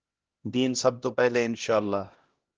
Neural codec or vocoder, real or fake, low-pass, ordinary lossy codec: codec, 16 kHz, 1 kbps, X-Codec, HuBERT features, trained on LibriSpeech; fake; 7.2 kHz; Opus, 16 kbps